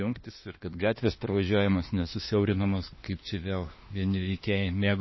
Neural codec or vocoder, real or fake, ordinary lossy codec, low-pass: autoencoder, 48 kHz, 32 numbers a frame, DAC-VAE, trained on Japanese speech; fake; MP3, 24 kbps; 7.2 kHz